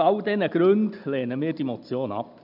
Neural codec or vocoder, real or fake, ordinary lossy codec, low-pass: none; real; none; 5.4 kHz